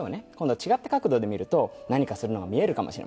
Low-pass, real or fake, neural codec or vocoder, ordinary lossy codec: none; real; none; none